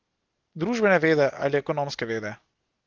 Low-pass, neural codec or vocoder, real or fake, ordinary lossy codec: 7.2 kHz; none; real; Opus, 16 kbps